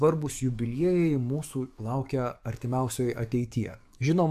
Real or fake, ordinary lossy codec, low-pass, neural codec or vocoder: fake; AAC, 96 kbps; 14.4 kHz; codec, 44.1 kHz, 7.8 kbps, DAC